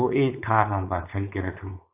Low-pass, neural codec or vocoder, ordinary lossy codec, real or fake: 3.6 kHz; codec, 16 kHz, 4.8 kbps, FACodec; AAC, 32 kbps; fake